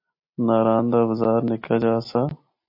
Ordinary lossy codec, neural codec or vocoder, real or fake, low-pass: MP3, 32 kbps; none; real; 5.4 kHz